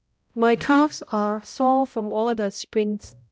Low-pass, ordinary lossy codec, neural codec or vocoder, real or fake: none; none; codec, 16 kHz, 0.5 kbps, X-Codec, HuBERT features, trained on balanced general audio; fake